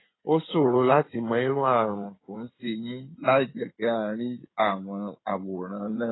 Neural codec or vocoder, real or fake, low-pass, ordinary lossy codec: codec, 16 kHz in and 24 kHz out, 2.2 kbps, FireRedTTS-2 codec; fake; 7.2 kHz; AAC, 16 kbps